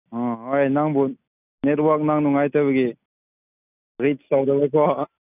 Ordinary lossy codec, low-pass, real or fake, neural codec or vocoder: none; 3.6 kHz; real; none